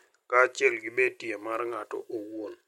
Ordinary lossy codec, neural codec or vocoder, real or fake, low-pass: MP3, 64 kbps; none; real; 19.8 kHz